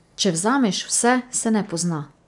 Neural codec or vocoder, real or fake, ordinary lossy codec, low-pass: none; real; none; 10.8 kHz